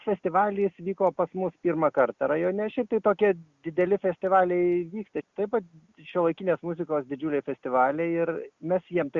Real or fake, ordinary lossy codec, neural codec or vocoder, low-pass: real; Opus, 64 kbps; none; 7.2 kHz